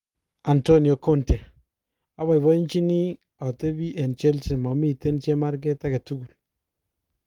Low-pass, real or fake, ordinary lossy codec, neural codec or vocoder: 19.8 kHz; fake; Opus, 32 kbps; codec, 44.1 kHz, 7.8 kbps, Pupu-Codec